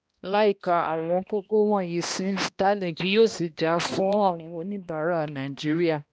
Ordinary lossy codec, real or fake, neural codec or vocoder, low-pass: none; fake; codec, 16 kHz, 1 kbps, X-Codec, HuBERT features, trained on balanced general audio; none